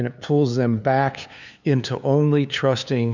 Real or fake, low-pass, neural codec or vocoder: fake; 7.2 kHz; codec, 16 kHz, 2 kbps, FunCodec, trained on LibriTTS, 25 frames a second